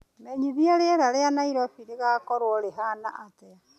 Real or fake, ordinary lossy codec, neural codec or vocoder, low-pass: real; none; none; 14.4 kHz